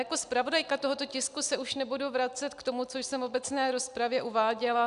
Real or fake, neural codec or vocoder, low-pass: real; none; 10.8 kHz